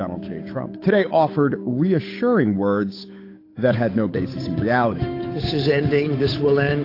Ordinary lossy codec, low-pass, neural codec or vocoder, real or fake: AAC, 32 kbps; 5.4 kHz; codec, 16 kHz, 8 kbps, FunCodec, trained on Chinese and English, 25 frames a second; fake